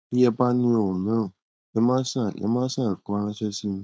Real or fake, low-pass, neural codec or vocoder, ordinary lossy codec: fake; none; codec, 16 kHz, 4.8 kbps, FACodec; none